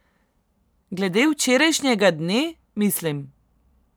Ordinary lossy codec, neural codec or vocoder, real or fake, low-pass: none; vocoder, 44.1 kHz, 128 mel bands every 512 samples, BigVGAN v2; fake; none